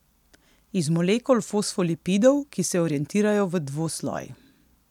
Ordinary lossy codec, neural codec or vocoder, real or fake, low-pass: none; none; real; 19.8 kHz